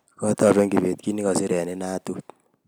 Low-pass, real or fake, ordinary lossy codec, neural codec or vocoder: none; real; none; none